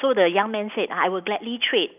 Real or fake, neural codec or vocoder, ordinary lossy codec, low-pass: real; none; none; 3.6 kHz